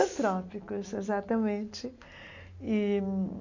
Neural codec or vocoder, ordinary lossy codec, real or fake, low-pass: none; AAC, 48 kbps; real; 7.2 kHz